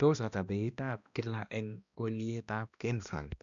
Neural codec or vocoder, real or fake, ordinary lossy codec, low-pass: codec, 16 kHz, 1 kbps, X-Codec, HuBERT features, trained on balanced general audio; fake; none; 7.2 kHz